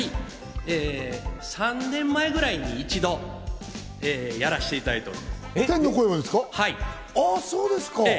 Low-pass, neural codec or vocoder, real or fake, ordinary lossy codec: none; none; real; none